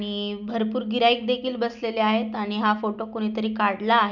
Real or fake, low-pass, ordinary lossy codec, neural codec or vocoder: real; 7.2 kHz; none; none